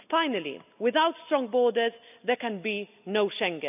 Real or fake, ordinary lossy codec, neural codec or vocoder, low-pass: real; none; none; 3.6 kHz